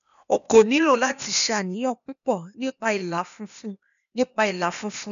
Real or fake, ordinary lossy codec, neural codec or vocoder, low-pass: fake; none; codec, 16 kHz, 0.8 kbps, ZipCodec; 7.2 kHz